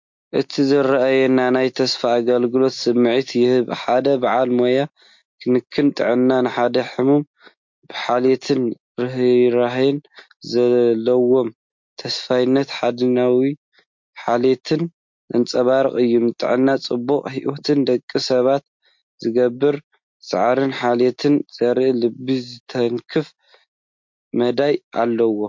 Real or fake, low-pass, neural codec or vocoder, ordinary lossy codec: real; 7.2 kHz; none; MP3, 48 kbps